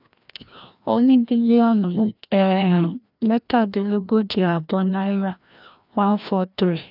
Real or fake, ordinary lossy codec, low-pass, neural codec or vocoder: fake; none; 5.4 kHz; codec, 16 kHz, 1 kbps, FreqCodec, larger model